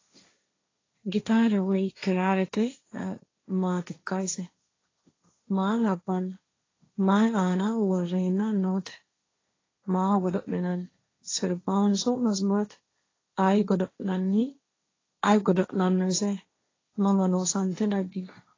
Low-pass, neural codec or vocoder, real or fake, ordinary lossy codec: 7.2 kHz; codec, 16 kHz, 1.1 kbps, Voila-Tokenizer; fake; AAC, 32 kbps